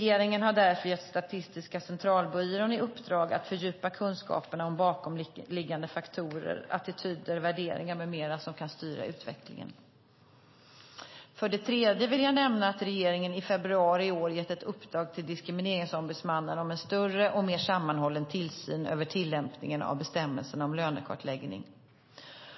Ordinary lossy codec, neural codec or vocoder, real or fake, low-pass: MP3, 24 kbps; none; real; 7.2 kHz